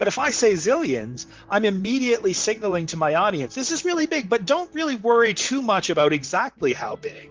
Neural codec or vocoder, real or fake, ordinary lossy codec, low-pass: vocoder, 44.1 kHz, 128 mel bands, Pupu-Vocoder; fake; Opus, 32 kbps; 7.2 kHz